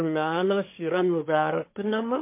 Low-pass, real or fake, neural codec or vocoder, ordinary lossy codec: 3.6 kHz; fake; codec, 24 kHz, 1 kbps, SNAC; MP3, 24 kbps